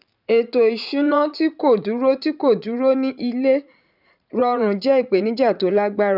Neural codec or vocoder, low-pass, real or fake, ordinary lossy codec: vocoder, 44.1 kHz, 80 mel bands, Vocos; 5.4 kHz; fake; none